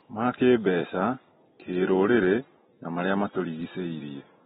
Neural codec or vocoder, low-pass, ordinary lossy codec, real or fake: none; 19.8 kHz; AAC, 16 kbps; real